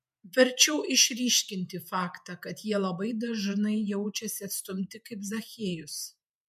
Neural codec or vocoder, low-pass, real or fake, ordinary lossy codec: vocoder, 48 kHz, 128 mel bands, Vocos; 14.4 kHz; fake; MP3, 96 kbps